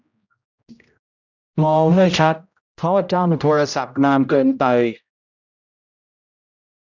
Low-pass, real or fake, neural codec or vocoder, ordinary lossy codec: 7.2 kHz; fake; codec, 16 kHz, 0.5 kbps, X-Codec, HuBERT features, trained on general audio; none